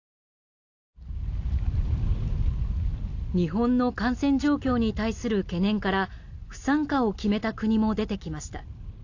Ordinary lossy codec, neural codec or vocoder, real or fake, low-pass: AAC, 48 kbps; none; real; 7.2 kHz